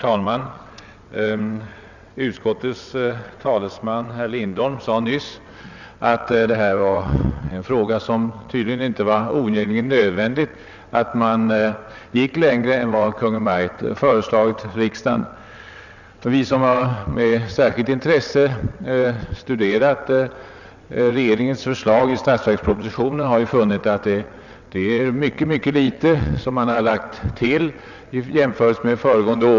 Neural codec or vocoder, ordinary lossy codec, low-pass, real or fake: vocoder, 22.05 kHz, 80 mel bands, WaveNeXt; none; 7.2 kHz; fake